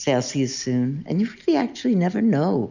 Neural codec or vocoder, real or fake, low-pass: none; real; 7.2 kHz